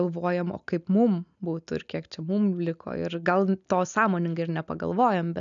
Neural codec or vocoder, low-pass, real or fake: none; 7.2 kHz; real